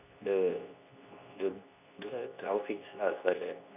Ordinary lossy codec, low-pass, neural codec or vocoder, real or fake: none; 3.6 kHz; codec, 24 kHz, 0.9 kbps, WavTokenizer, medium speech release version 2; fake